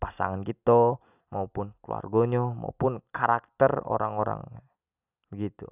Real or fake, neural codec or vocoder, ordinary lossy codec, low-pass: real; none; none; 3.6 kHz